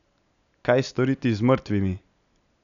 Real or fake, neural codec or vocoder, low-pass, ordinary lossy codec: real; none; 7.2 kHz; none